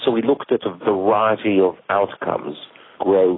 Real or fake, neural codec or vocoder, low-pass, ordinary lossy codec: real; none; 7.2 kHz; AAC, 16 kbps